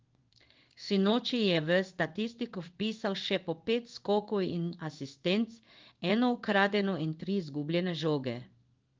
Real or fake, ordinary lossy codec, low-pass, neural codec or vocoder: fake; Opus, 32 kbps; 7.2 kHz; codec, 16 kHz in and 24 kHz out, 1 kbps, XY-Tokenizer